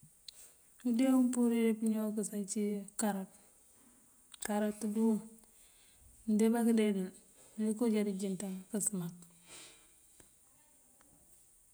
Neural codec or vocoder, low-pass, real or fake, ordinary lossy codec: vocoder, 48 kHz, 128 mel bands, Vocos; none; fake; none